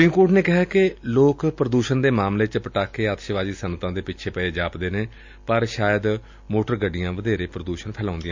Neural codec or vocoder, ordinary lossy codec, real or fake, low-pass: none; MP3, 64 kbps; real; 7.2 kHz